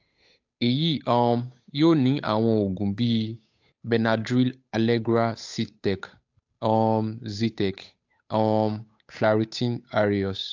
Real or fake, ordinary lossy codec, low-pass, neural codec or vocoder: fake; AAC, 48 kbps; 7.2 kHz; codec, 16 kHz, 8 kbps, FunCodec, trained on Chinese and English, 25 frames a second